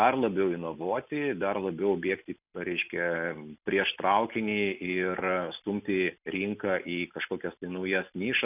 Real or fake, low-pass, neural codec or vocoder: real; 3.6 kHz; none